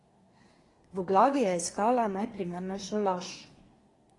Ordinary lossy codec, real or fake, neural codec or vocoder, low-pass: AAC, 32 kbps; fake; codec, 24 kHz, 1 kbps, SNAC; 10.8 kHz